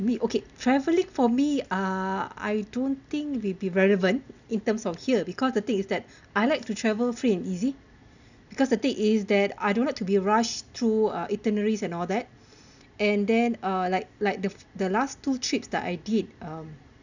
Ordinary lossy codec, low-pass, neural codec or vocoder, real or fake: none; 7.2 kHz; none; real